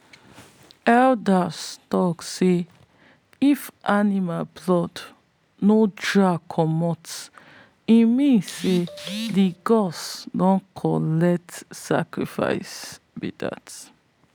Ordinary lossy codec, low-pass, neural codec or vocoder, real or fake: none; none; none; real